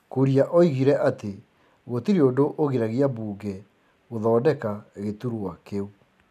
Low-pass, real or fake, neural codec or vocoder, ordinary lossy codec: 14.4 kHz; real; none; none